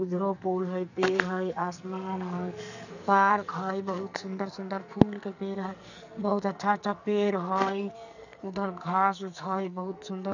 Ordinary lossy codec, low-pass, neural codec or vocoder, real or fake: none; 7.2 kHz; codec, 44.1 kHz, 2.6 kbps, SNAC; fake